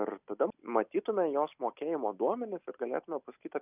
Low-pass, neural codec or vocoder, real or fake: 3.6 kHz; none; real